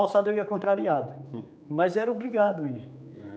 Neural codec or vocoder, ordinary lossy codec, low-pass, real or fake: codec, 16 kHz, 4 kbps, X-Codec, HuBERT features, trained on general audio; none; none; fake